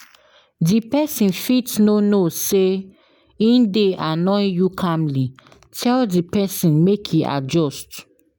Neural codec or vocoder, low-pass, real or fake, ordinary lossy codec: none; none; real; none